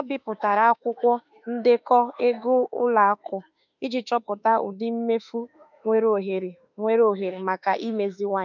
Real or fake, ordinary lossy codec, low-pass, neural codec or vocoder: fake; none; 7.2 kHz; autoencoder, 48 kHz, 32 numbers a frame, DAC-VAE, trained on Japanese speech